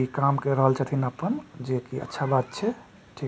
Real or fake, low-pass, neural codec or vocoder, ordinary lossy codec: real; none; none; none